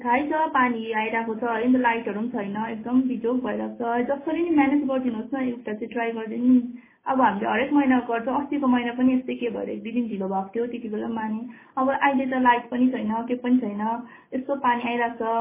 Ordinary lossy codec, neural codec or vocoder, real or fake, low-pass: MP3, 16 kbps; none; real; 3.6 kHz